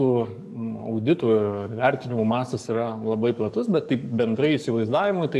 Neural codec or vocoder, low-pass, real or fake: codec, 44.1 kHz, 7.8 kbps, DAC; 14.4 kHz; fake